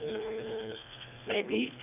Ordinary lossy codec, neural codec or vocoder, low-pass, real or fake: none; codec, 24 kHz, 1.5 kbps, HILCodec; 3.6 kHz; fake